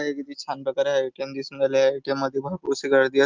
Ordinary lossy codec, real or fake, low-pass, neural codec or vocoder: Opus, 32 kbps; real; 7.2 kHz; none